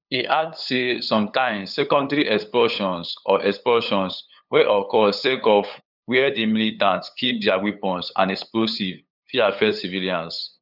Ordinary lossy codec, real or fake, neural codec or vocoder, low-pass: none; fake; codec, 16 kHz, 8 kbps, FunCodec, trained on LibriTTS, 25 frames a second; 5.4 kHz